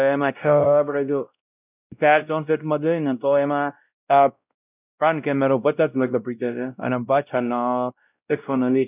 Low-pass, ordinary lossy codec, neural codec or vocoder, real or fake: 3.6 kHz; none; codec, 16 kHz, 0.5 kbps, X-Codec, WavLM features, trained on Multilingual LibriSpeech; fake